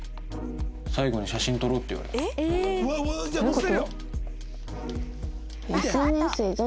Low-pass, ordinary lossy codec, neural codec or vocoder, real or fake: none; none; none; real